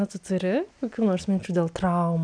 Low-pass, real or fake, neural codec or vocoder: 9.9 kHz; real; none